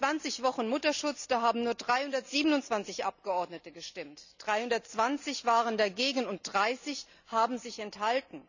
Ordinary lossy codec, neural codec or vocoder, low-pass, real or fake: none; none; 7.2 kHz; real